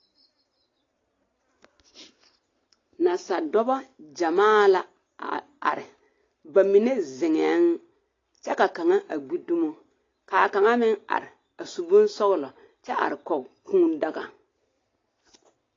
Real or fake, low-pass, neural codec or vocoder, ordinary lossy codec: real; 7.2 kHz; none; AAC, 32 kbps